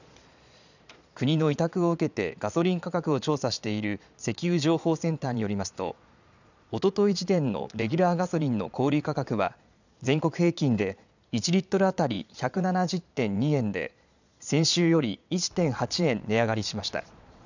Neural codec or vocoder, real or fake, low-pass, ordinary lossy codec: vocoder, 22.05 kHz, 80 mel bands, Vocos; fake; 7.2 kHz; none